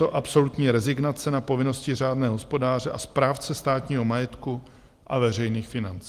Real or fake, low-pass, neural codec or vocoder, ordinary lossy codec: real; 14.4 kHz; none; Opus, 24 kbps